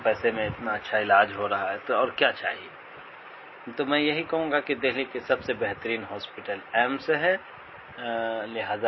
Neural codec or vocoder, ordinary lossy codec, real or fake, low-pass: none; MP3, 24 kbps; real; 7.2 kHz